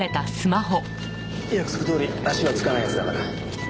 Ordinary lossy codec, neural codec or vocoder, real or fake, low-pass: none; none; real; none